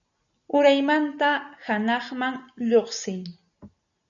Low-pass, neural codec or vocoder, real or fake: 7.2 kHz; none; real